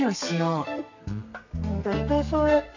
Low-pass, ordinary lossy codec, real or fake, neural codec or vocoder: 7.2 kHz; none; fake; codec, 32 kHz, 1.9 kbps, SNAC